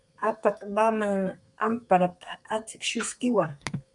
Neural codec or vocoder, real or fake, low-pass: codec, 32 kHz, 1.9 kbps, SNAC; fake; 10.8 kHz